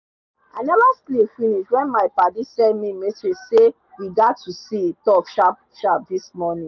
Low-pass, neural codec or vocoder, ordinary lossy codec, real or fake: 7.2 kHz; none; none; real